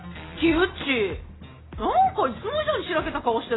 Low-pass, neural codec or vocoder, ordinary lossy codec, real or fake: 7.2 kHz; none; AAC, 16 kbps; real